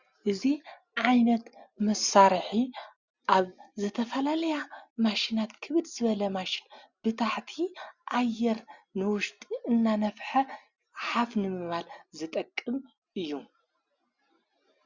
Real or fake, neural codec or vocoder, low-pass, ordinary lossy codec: real; none; 7.2 kHz; Opus, 64 kbps